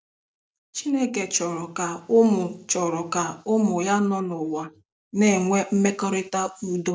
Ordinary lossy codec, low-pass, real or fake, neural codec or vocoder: none; none; real; none